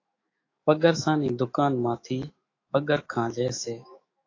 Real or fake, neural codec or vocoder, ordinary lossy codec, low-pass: fake; autoencoder, 48 kHz, 128 numbers a frame, DAC-VAE, trained on Japanese speech; AAC, 32 kbps; 7.2 kHz